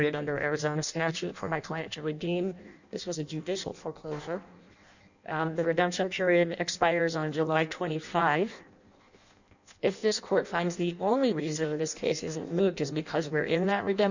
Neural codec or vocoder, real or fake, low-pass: codec, 16 kHz in and 24 kHz out, 0.6 kbps, FireRedTTS-2 codec; fake; 7.2 kHz